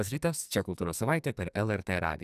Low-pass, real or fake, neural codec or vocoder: 14.4 kHz; fake; codec, 44.1 kHz, 2.6 kbps, SNAC